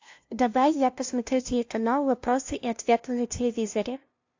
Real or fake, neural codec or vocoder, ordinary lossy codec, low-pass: fake; codec, 16 kHz, 0.5 kbps, FunCodec, trained on LibriTTS, 25 frames a second; AAC, 48 kbps; 7.2 kHz